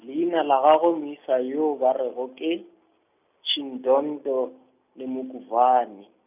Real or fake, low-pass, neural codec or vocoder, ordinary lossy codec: real; 3.6 kHz; none; none